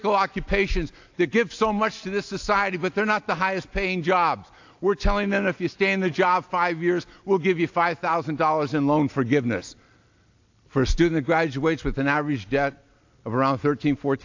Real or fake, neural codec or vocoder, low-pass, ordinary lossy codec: real; none; 7.2 kHz; AAC, 48 kbps